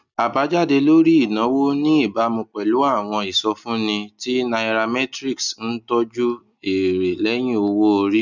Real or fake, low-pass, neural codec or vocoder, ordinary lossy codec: real; 7.2 kHz; none; none